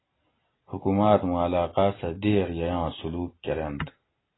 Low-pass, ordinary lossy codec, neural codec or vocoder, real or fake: 7.2 kHz; AAC, 16 kbps; none; real